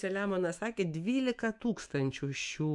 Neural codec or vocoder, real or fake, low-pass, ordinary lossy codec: autoencoder, 48 kHz, 128 numbers a frame, DAC-VAE, trained on Japanese speech; fake; 10.8 kHz; MP3, 64 kbps